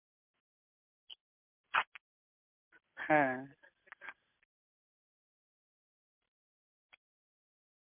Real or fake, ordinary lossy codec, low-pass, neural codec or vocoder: real; MP3, 32 kbps; 3.6 kHz; none